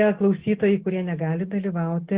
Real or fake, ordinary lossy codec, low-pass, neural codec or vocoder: real; Opus, 16 kbps; 3.6 kHz; none